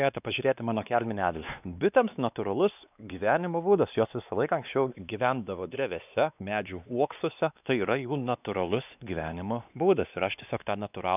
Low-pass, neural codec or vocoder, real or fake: 3.6 kHz; codec, 16 kHz, 2 kbps, X-Codec, WavLM features, trained on Multilingual LibriSpeech; fake